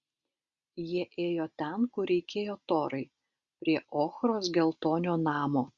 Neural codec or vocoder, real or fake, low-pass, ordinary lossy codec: none; real; 7.2 kHz; Opus, 64 kbps